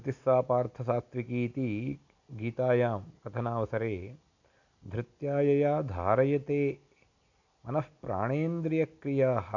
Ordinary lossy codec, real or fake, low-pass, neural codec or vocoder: none; real; 7.2 kHz; none